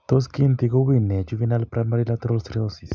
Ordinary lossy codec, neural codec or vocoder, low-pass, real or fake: none; none; none; real